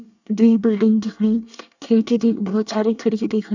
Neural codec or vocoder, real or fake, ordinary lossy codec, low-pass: codec, 24 kHz, 1 kbps, SNAC; fake; none; 7.2 kHz